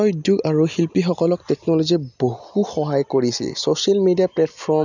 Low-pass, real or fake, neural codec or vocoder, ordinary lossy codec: 7.2 kHz; real; none; none